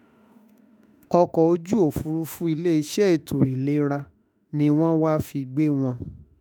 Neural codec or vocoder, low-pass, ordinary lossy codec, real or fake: autoencoder, 48 kHz, 32 numbers a frame, DAC-VAE, trained on Japanese speech; none; none; fake